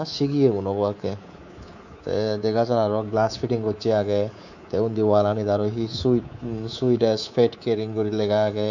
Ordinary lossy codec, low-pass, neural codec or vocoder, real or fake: none; 7.2 kHz; codec, 24 kHz, 3.1 kbps, DualCodec; fake